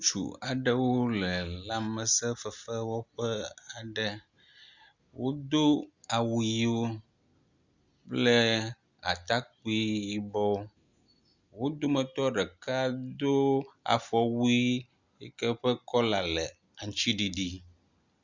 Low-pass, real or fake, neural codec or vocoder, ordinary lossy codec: 7.2 kHz; real; none; Opus, 64 kbps